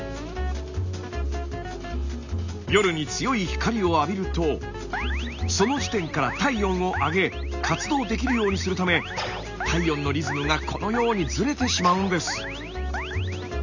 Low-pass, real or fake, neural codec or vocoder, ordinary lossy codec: 7.2 kHz; real; none; none